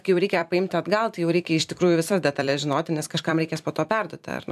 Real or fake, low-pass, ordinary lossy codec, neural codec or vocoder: fake; 14.4 kHz; AAC, 96 kbps; vocoder, 44.1 kHz, 128 mel bands every 256 samples, BigVGAN v2